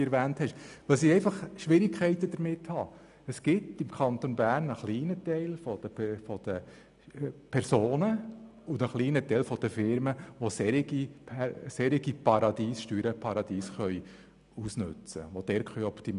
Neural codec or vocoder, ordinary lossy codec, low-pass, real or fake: none; none; 10.8 kHz; real